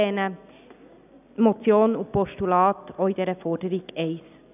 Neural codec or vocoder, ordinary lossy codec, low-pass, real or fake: none; none; 3.6 kHz; real